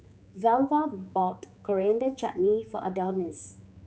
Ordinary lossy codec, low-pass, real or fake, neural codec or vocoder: none; none; fake; codec, 16 kHz, 4 kbps, X-Codec, HuBERT features, trained on general audio